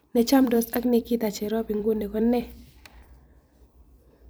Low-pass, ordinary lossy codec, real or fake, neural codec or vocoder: none; none; fake; vocoder, 44.1 kHz, 128 mel bands every 256 samples, BigVGAN v2